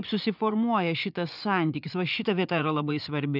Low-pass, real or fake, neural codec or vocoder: 5.4 kHz; fake; vocoder, 24 kHz, 100 mel bands, Vocos